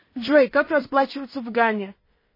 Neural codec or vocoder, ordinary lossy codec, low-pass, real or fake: codec, 16 kHz in and 24 kHz out, 0.4 kbps, LongCat-Audio-Codec, two codebook decoder; MP3, 24 kbps; 5.4 kHz; fake